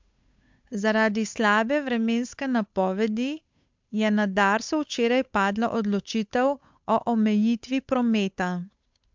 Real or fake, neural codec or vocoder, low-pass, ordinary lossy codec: fake; codec, 16 kHz, 8 kbps, FunCodec, trained on Chinese and English, 25 frames a second; 7.2 kHz; MP3, 64 kbps